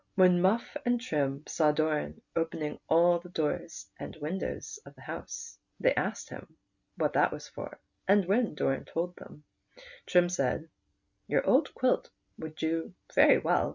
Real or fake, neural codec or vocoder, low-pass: real; none; 7.2 kHz